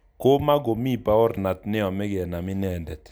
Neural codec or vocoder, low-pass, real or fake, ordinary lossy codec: none; none; real; none